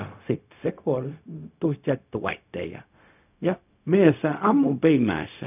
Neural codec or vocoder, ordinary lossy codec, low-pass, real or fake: codec, 16 kHz, 0.4 kbps, LongCat-Audio-Codec; none; 3.6 kHz; fake